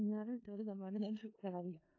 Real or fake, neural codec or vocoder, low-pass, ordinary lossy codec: fake; codec, 16 kHz in and 24 kHz out, 0.4 kbps, LongCat-Audio-Codec, four codebook decoder; 7.2 kHz; AAC, 32 kbps